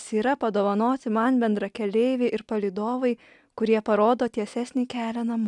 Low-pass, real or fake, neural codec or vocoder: 10.8 kHz; real; none